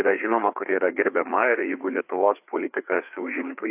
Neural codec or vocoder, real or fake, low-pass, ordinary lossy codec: codec, 16 kHz, 4 kbps, FreqCodec, larger model; fake; 3.6 kHz; AAC, 32 kbps